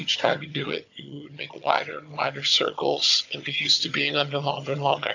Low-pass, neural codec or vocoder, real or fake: 7.2 kHz; vocoder, 22.05 kHz, 80 mel bands, HiFi-GAN; fake